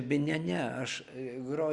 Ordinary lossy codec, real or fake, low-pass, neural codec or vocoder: Opus, 64 kbps; real; 10.8 kHz; none